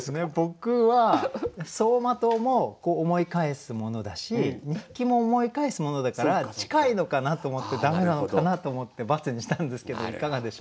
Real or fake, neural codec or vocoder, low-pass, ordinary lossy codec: real; none; none; none